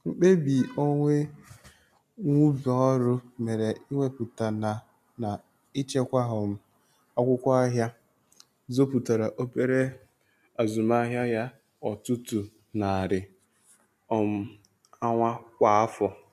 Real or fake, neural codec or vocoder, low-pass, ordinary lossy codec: real; none; 14.4 kHz; MP3, 96 kbps